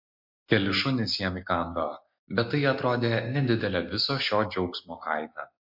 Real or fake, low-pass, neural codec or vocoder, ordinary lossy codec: real; 5.4 kHz; none; MP3, 32 kbps